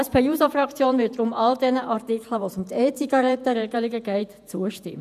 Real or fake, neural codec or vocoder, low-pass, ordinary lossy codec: fake; vocoder, 48 kHz, 128 mel bands, Vocos; 14.4 kHz; none